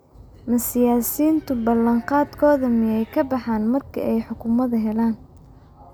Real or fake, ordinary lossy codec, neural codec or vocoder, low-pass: real; none; none; none